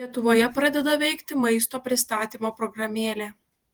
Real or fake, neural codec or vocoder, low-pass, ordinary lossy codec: fake; vocoder, 48 kHz, 128 mel bands, Vocos; 19.8 kHz; Opus, 24 kbps